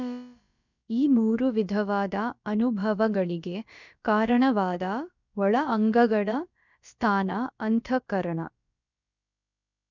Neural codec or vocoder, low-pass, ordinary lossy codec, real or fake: codec, 16 kHz, about 1 kbps, DyCAST, with the encoder's durations; 7.2 kHz; none; fake